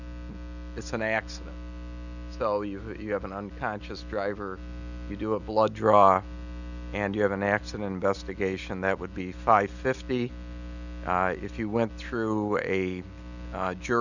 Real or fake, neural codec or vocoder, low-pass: real; none; 7.2 kHz